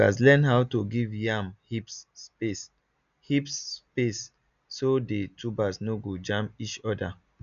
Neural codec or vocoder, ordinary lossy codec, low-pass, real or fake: none; none; 7.2 kHz; real